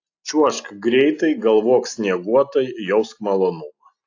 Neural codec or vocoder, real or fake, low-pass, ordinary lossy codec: none; real; 7.2 kHz; AAC, 48 kbps